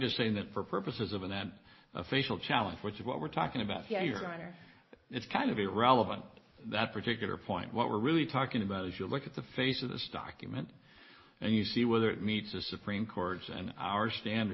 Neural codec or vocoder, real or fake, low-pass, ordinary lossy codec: none; real; 7.2 kHz; MP3, 24 kbps